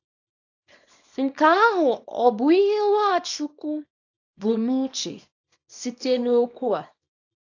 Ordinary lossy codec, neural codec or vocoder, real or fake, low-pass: AAC, 48 kbps; codec, 24 kHz, 0.9 kbps, WavTokenizer, small release; fake; 7.2 kHz